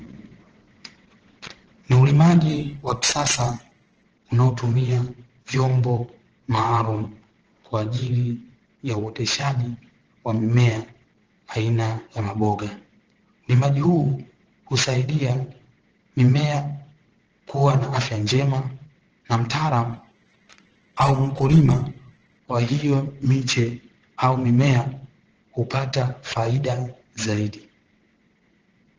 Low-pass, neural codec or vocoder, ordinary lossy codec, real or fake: 7.2 kHz; vocoder, 22.05 kHz, 80 mel bands, WaveNeXt; Opus, 16 kbps; fake